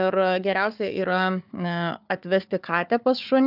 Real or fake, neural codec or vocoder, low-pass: fake; codec, 16 kHz, 4 kbps, FunCodec, trained on Chinese and English, 50 frames a second; 5.4 kHz